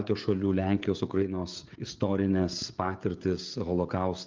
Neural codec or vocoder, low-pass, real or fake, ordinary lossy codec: codec, 16 kHz, 16 kbps, FreqCodec, smaller model; 7.2 kHz; fake; Opus, 24 kbps